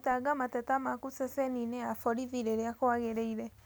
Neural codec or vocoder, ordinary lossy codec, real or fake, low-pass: none; none; real; none